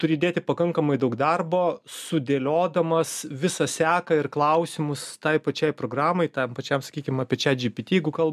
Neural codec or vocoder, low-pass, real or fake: none; 14.4 kHz; real